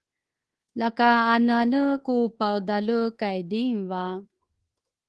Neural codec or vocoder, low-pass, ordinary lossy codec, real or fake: codec, 24 kHz, 1.2 kbps, DualCodec; 10.8 kHz; Opus, 16 kbps; fake